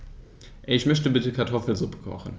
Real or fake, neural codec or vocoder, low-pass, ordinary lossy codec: real; none; none; none